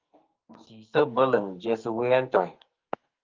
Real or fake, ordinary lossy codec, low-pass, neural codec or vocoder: fake; Opus, 32 kbps; 7.2 kHz; codec, 44.1 kHz, 2.6 kbps, SNAC